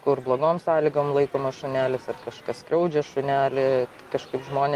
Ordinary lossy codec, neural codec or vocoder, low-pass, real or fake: Opus, 32 kbps; none; 14.4 kHz; real